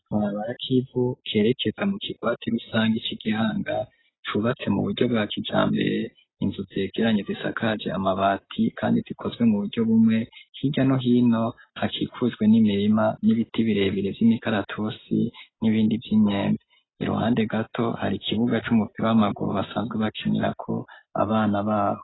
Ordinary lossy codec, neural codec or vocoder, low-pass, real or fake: AAC, 16 kbps; none; 7.2 kHz; real